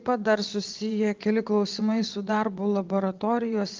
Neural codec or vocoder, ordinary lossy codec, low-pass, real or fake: vocoder, 22.05 kHz, 80 mel bands, WaveNeXt; Opus, 32 kbps; 7.2 kHz; fake